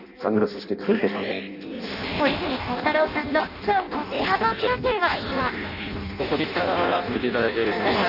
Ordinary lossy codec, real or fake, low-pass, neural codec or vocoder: none; fake; 5.4 kHz; codec, 16 kHz in and 24 kHz out, 0.6 kbps, FireRedTTS-2 codec